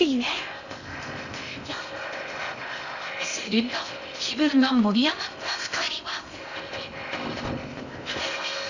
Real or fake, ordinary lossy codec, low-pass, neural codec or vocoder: fake; none; 7.2 kHz; codec, 16 kHz in and 24 kHz out, 0.6 kbps, FocalCodec, streaming, 2048 codes